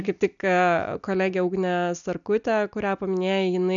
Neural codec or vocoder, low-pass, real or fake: none; 7.2 kHz; real